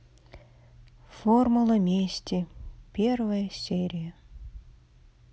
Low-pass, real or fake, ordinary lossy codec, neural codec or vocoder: none; real; none; none